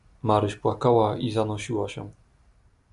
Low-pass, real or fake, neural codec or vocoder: 10.8 kHz; real; none